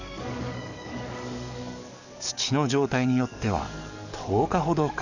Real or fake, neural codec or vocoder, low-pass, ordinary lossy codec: fake; codec, 44.1 kHz, 7.8 kbps, DAC; 7.2 kHz; none